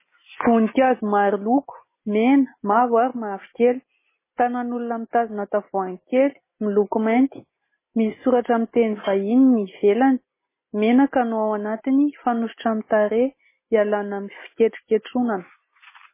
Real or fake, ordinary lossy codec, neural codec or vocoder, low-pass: real; MP3, 16 kbps; none; 3.6 kHz